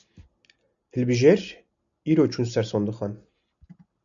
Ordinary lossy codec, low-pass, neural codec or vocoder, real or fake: MP3, 96 kbps; 7.2 kHz; none; real